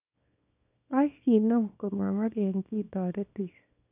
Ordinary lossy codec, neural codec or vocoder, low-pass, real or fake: none; codec, 24 kHz, 0.9 kbps, WavTokenizer, small release; 3.6 kHz; fake